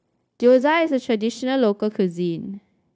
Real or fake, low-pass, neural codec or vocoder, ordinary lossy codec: fake; none; codec, 16 kHz, 0.9 kbps, LongCat-Audio-Codec; none